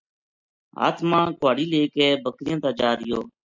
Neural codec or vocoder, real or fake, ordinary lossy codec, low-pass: none; real; MP3, 64 kbps; 7.2 kHz